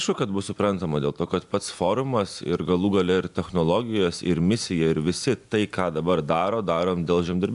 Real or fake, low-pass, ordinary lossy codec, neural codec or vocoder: real; 10.8 kHz; AAC, 96 kbps; none